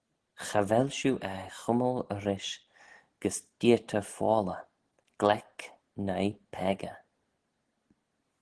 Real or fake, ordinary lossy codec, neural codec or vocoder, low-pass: real; Opus, 16 kbps; none; 10.8 kHz